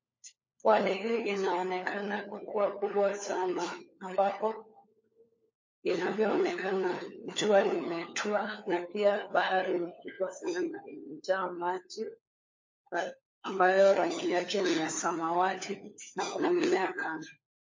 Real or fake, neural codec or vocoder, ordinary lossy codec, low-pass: fake; codec, 16 kHz, 4 kbps, FunCodec, trained on LibriTTS, 50 frames a second; MP3, 32 kbps; 7.2 kHz